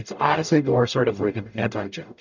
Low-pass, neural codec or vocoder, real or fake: 7.2 kHz; codec, 44.1 kHz, 0.9 kbps, DAC; fake